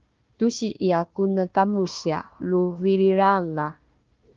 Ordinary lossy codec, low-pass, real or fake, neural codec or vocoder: Opus, 32 kbps; 7.2 kHz; fake; codec, 16 kHz, 1 kbps, FunCodec, trained on Chinese and English, 50 frames a second